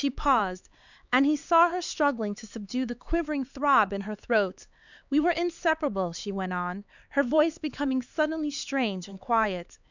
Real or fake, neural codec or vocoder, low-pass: fake; codec, 16 kHz, 4 kbps, X-Codec, HuBERT features, trained on LibriSpeech; 7.2 kHz